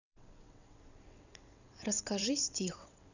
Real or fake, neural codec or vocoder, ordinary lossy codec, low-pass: fake; vocoder, 22.05 kHz, 80 mel bands, WaveNeXt; none; 7.2 kHz